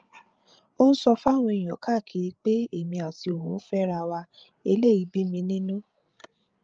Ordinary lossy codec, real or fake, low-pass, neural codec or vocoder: Opus, 24 kbps; fake; 7.2 kHz; codec, 16 kHz, 8 kbps, FreqCodec, larger model